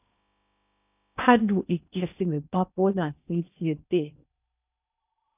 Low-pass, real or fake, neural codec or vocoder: 3.6 kHz; fake; codec, 16 kHz in and 24 kHz out, 0.6 kbps, FocalCodec, streaming, 2048 codes